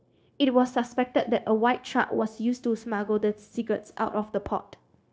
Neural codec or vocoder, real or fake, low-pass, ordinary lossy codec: codec, 16 kHz, 0.9 kbps, LongCat-Audio-Codec; fake; none; none